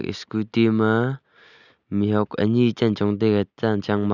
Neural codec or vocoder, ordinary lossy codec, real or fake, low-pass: none; none; real; 7.2 kHz